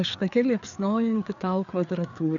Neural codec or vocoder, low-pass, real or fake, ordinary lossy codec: codec, 16 kHz, 4 kbps, FreqCodec, larger model; 7.2 kHz; fake; MP3, 96 kbps